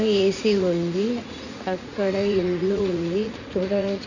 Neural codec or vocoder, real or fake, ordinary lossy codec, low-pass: vocoder, 44.1 kHz, 80 mel bands, Vocos; fake; none; 7.2 kHz